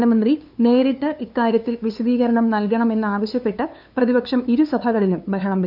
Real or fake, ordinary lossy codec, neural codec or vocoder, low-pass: fake; none; codec, 16 kHz, 2 kbps, FunCodec, trained on LibriTTS, 25 frames a second; 5.4 kHz